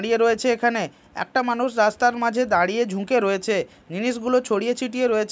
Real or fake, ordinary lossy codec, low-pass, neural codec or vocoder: real; none; none; none